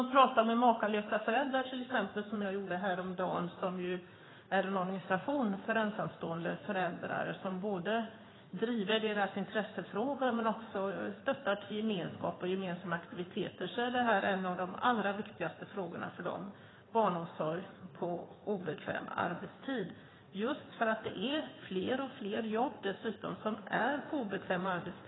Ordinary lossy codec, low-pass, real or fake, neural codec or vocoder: AAC, 16 kbps; 7.2 kHz; fake; codec, 16 kHz in and 24 kHz out, 2.2 kbps, FireRedTTS-2 codec